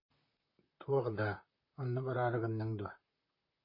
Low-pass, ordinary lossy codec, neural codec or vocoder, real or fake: 5.4 kHz; MP3, 24 kbps; vocoder, 44.1 kHz, 128 mel bands, Pupu-Vocoder; fake